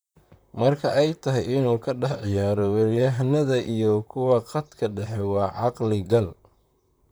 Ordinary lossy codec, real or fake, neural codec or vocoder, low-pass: none; fake; vocoder, 44.1 kHz, 128 mel bands, Pupu-Vocoder; none